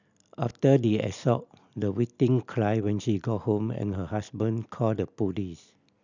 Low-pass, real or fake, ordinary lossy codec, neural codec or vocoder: 7.2 kHz; real; none; none